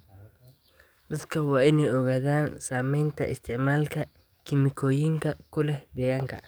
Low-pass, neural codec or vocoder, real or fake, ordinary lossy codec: none; codec, 44.1 kHz, 7.8 kbps, DAC; fake; none